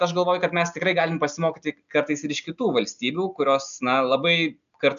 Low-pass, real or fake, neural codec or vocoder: 7.2 kHz; real; none